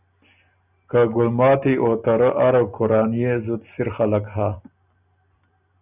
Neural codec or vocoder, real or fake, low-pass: none; real; 3.6 kHz